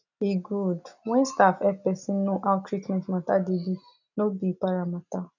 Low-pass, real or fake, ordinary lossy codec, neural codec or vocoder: 7.2 kHz; real; none; none